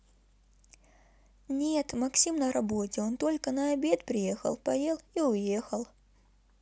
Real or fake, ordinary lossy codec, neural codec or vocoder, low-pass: real; none; none; none